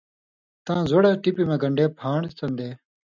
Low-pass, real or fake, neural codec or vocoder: 7.2 kHz; real; none